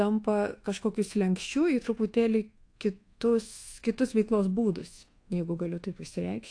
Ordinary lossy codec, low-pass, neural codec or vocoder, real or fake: AAC, 48 kbps; 9.9 kHz; codec, 24 kHz, 1.2 kbps, DualCodec; fake